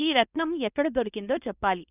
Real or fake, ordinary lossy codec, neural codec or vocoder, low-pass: fake; none; codec, 24 kHz, 0.9 kbps, WavTokenizer, medium speech release version 2; 3.6 kHz